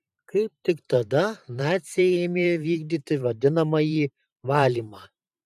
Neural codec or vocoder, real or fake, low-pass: vocoder, 44.1 kHz, 128 mel bands every 512 samples, BigVGAN v2; fake; 14.4 kHz